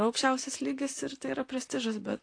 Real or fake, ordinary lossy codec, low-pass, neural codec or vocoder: fake; AAC, 48 kbps; 9.9 kHz; vocoder, 44.1 kHz, 128 mel bands, Pupu-Vocoder